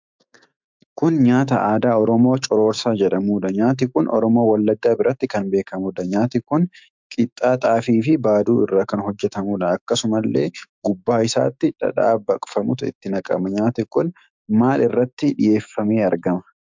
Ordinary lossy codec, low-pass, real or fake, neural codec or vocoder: MP3, 64 kbps; 7.2 kHz; fake; autoencoder, 48 kHz, 128 numbers a frame, DAC-VAE, trained on Japanese speech